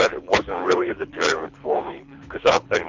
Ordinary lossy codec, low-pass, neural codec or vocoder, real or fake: MP3, 64 kbps; 7.2 kHz; codec, 24 kHz, 3 kbps, HILCodec; fake